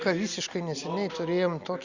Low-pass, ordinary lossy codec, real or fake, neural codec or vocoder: 7.2 kHz; Opus, 64 kbps; real; none